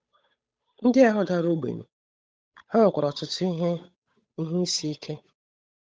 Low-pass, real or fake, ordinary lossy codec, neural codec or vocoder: none; fake; none; codec, 16 kHz, 8 kbps, FunCodec, trained on Chinese and English, 25 frames a second